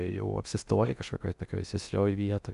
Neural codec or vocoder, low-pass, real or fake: codec, 16 kHz in and 24 kHz out, 0.6 kbps, FocalCodec, streaming, 2048 codes; 10.8 kHz; fake